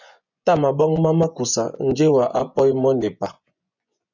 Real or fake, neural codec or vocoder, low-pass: fake; vocoder, 24 kHz, 100 mel bands, Vocos; 7.2 kHz